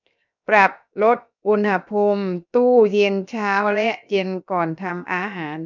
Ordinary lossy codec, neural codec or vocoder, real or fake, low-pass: none; codec, 16 kHz, 0.7 kbps, FocalCodec; fake; 7.2 kHz